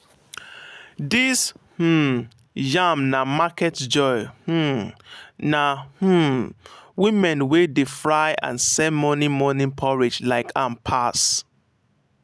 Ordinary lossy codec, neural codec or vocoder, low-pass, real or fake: none; none; none; real